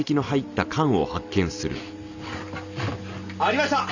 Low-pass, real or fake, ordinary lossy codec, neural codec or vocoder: 7.2 kHz; real; none; none